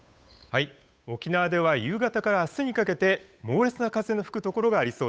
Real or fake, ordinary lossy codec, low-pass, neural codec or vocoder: fake; none; none; codec, 16 kHz, 8 kbps, FunCodec, trained on Chinese and English, 25 frames a second